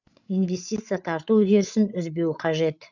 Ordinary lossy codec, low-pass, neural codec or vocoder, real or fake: none; 7.2 kHz; codec, 16 kHz, 8 kbps, FreqCodec, larger model; fake